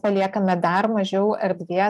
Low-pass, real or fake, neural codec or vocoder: 14.4 kHz; real; none